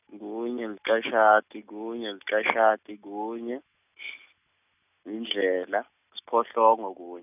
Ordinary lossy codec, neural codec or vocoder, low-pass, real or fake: none; none; 3.6 kHz; real